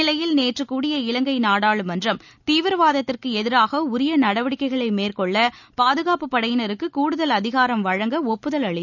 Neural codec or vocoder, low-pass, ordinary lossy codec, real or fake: none; 7.2 kHz; none; real